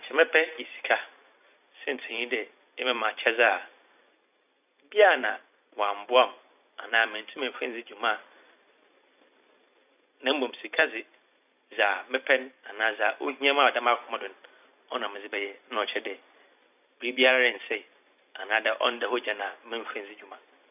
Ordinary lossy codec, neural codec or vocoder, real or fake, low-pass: none; vocoder, 44.1 kHz, 128 mel bands every 256 samples, BigVGAN v2; fake; 3.6 kHz